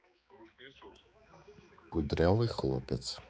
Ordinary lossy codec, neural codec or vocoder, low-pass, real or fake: none; codec, 16 kHz, 4 kbps, X-Codec, HuBERT features, trained on balanced general audio; none; fake